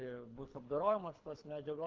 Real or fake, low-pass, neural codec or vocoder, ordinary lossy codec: fake; 7.2 kHz; codec, 24 kHz, 3 kbps, HILCodec; Opus, 24 kbps